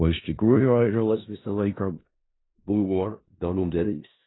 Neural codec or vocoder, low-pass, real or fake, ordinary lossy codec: codec, 16 kHz in and 24 kHz out, 0.4 kbps, LongCat-Audio-Codec, four codebook decoder; 7.2 kHz; fake; AAC, 16 kbps